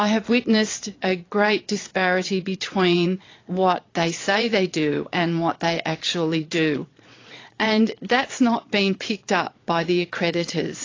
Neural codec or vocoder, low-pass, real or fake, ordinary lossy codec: vocoder, 22.05 kHz, 80 mel bands, Vocos; 7.2 kHz; fake; AAC, 32 kbps